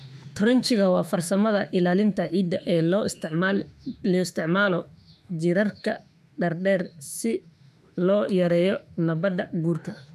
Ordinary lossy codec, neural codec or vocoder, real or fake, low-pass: none; autoencoder, 48 kHz, 32 numbers a frame, DAC-VAE, trained on Japanese speech; fake; 14.4 kHz